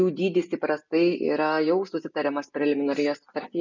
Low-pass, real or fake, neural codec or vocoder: 7.2 kHz; real; none